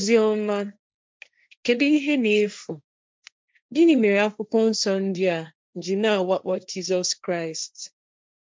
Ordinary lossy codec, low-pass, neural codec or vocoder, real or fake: none; none; codec, 16 kHz, 1.1 kbps, Voila-Tokenizer; fake